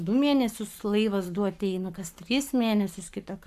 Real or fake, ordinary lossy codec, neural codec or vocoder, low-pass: fake; MP3, 96 kbps; codec, 44.1 kHz, 7.8 kbps, Pupu-Codec; 14.4 kHz